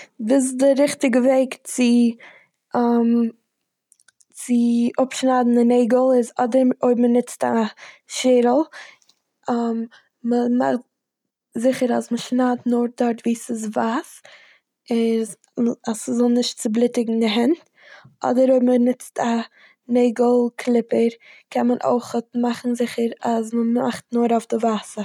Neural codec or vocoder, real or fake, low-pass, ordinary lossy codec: none; real; 19.8 kHz; none